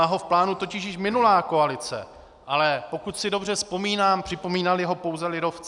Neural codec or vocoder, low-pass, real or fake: none; 10.8 kHz; real